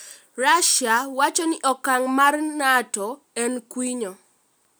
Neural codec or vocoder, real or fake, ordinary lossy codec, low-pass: none; real; none; none